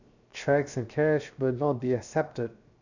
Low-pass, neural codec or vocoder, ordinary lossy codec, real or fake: 7.2 kHz; codec, 16 kHz, 0.7 kbps, FocalCodec; none; fake